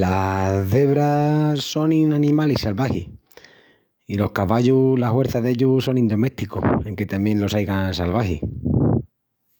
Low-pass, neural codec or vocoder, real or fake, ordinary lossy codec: none; codec, 44.1 kHz, 7.8 kbps, DAC; fake; none